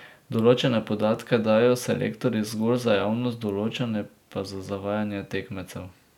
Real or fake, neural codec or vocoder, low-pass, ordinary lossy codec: real; none; 19.8 kHz; none